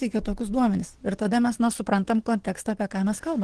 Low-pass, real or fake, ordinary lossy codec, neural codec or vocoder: 10.8 kHz; fake; Opus, 16 kbps; codec, 44.1 kHz, 7.8 kbps, DAC